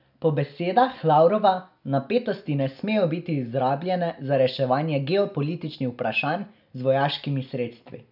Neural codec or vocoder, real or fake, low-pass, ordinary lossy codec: none; real; 5.4 kHz; none